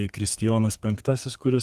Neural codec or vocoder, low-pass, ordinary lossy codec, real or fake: codec, 32 kHz, 1.9 kbps, SNAC; 14.4 kHz; Opus, 24 kbps; fake